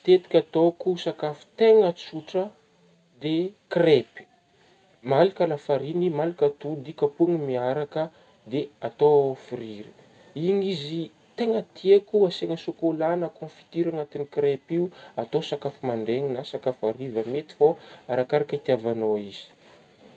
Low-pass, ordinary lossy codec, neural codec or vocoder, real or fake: 9.9 kHz; none; none; real